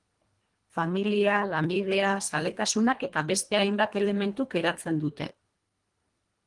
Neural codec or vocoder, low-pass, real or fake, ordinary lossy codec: codec, 24 kHz, 1.5 kbps, HILCodec; 10.8 kHz; fake; Opus, 24 kbps